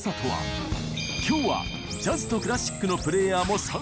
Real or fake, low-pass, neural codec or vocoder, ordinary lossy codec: real; none; none; none